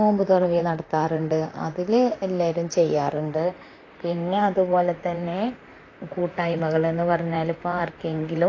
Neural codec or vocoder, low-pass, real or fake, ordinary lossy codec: vocoder, 44.1 kHz, 128 mel bands, Pupu-Vocoder; 7.2 kHz; fake; AAC, 32 kbps